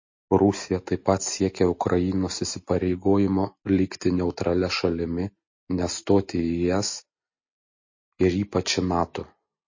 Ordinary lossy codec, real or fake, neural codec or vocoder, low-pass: MP3, 32 kbps; real; none; 7.2 kHz